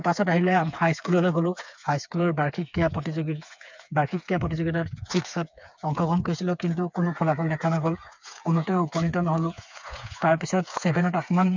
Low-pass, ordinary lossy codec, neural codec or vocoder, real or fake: 7.2 kHz; MP3, 64 kbps; codec, 16 kHz, 4 kbps, FreqCodec, smaller model; fake